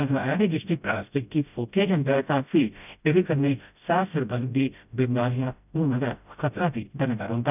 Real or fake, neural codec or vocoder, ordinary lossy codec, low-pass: fake; codec, 16 kHz, 0.5 kbps, FreqCodec, smaller model; none; 3.6 kHz